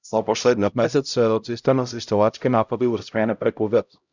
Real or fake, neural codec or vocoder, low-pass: fake; codec, 16 kHz, 0.5 kbps, X-Codec, HuBERT features, trained on LibriSpeech; 7.2 kHz